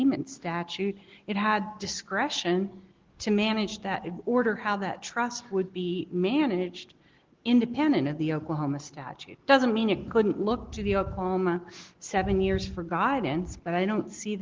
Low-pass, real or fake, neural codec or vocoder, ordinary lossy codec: 7.2 kHz; real; none; Opus, 16 kbps